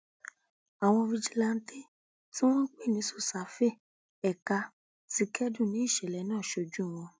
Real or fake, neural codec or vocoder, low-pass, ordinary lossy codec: real; none; none; none